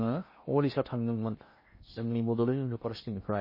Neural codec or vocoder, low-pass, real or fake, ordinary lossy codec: codec, 16 kHz in and 24 kHz out, 0.8 kbps, FocalCodec, streaming, 65536 codes; 5.4 kHz; fake; MP3, 24 kbps